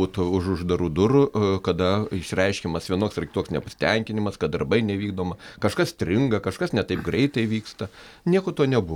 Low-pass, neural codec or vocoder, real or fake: 19.8 kHz; none; real